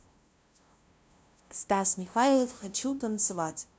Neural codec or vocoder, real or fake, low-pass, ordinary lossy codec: codec, 16 kHz, 0.5 kbps, FunCodec, trained on LibriTTS, 25 frames a second; fake; none; none